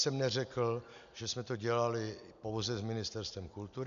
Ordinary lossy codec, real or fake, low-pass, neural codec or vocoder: MP3, 96 kbps; real; 7.2 kHz; none